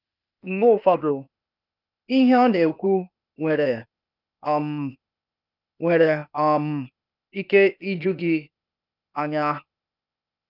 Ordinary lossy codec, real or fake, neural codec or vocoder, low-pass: none; fake; codec, 16 kHz, 0.8 kbps, ZipCodec; 5.4 kHz